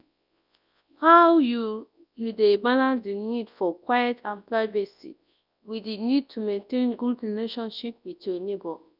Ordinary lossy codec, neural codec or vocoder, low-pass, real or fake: none; codec, 24 kHz, 0.9 kbps, WavTokenizer, large speech release; 5.4 kHz; fake